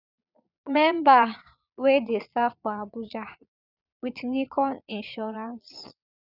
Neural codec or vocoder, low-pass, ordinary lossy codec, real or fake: vocoder, 22.05 kHz, 80 mel bands, Vocos; 5.4 kHz; none; fake